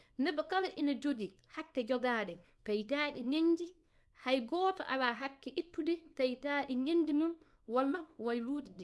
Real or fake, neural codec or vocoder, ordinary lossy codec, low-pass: fake; codec, 24 kHz, 0.9 kbps, WavTokenizer, small release; none; none